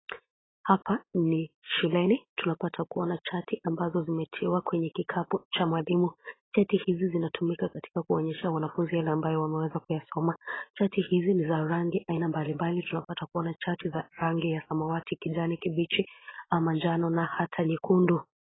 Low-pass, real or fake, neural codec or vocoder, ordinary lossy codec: 7.2 kHz; real; none; AAC, 16 kbps